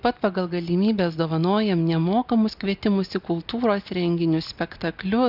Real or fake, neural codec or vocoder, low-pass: real; none; 5.4 kHz